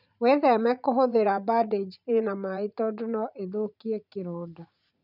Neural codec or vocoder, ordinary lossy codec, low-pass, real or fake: vocoder, 44.1 kHz, 80 mel bands, Vocos; none; 5.4 kHz; fake